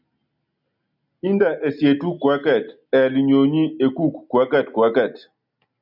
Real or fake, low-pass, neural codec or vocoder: real; 5.4 kHz; none